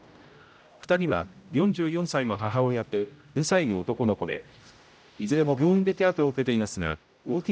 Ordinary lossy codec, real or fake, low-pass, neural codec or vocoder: none; fake; none; codec, 16 kHz, 0.5 kbps, X-Codec, HuBERT features, trained on general audio